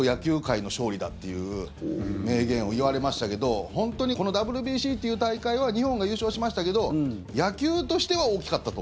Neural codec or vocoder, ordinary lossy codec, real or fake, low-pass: none; none; real; none